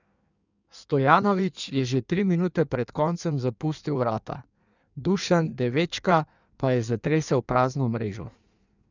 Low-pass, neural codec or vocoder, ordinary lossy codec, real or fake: 7.2 kHz; codec, 16 kHz in and 24 kHz out, 1.1 kbps, FireRedTTS-2 codec; none; fake